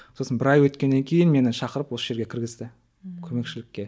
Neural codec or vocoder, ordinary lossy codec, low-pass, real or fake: none; none; none; real